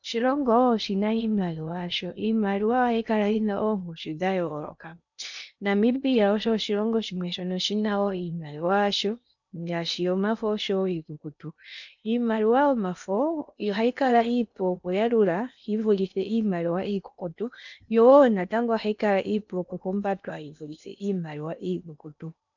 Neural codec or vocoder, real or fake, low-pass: codec, 16 kHz in and 24 kHz out, 0.8 kbps, FocalCodec, streaming, 65536 codes; fake; 7.2 kHz